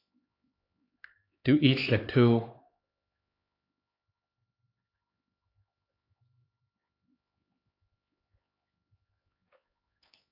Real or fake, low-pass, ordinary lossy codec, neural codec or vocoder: fake; 5.4 kHz; AAC, 32 kbps; codec, 16 kHz, 4 kbps, X-Codec, HuBERT features, trained on LibriSpeech